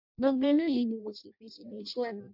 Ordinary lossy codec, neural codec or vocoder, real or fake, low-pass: MP3, 48 kbps; codec, 16 kHz in and 24 kHz out, 0.6 kbps, FireRedTTS-2 codec; fake; 5.4 kHz